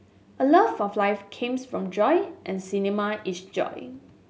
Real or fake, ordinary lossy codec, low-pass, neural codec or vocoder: real; none; none; none